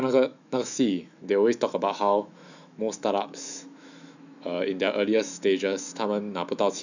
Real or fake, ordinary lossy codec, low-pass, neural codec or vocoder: fake; none; 7.2 kHz; autoencoder, 48 kHz, 128 numbers a frame, DAC-VAE, trained on Japanese speech